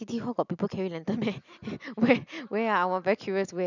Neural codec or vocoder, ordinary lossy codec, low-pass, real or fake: none; none; 7.2 kHz; real